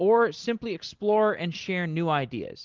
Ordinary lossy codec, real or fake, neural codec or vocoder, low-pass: Opus, 16 kbps; real; none; 7.2 kHz